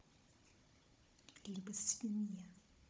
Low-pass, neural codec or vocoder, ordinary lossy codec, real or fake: none; codec, 16 kHz, 16 kbps, FunCodec, trained on Chinese and English, 50 frames a second; none; fake